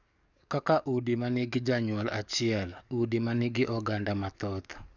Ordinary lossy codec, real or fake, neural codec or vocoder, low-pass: none; fake; codec, 44.1 kHz, 7.8 kbps, DAC; 7.2 kHz